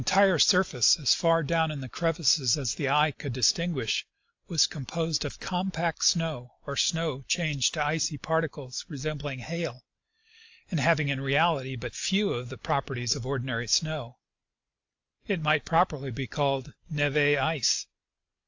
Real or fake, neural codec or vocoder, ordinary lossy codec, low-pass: real; none; AAC, 48 kbps; 7.2 kHz